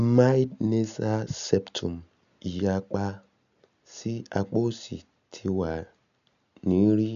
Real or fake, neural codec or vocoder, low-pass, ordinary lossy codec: real; none; 7.2 kHz; none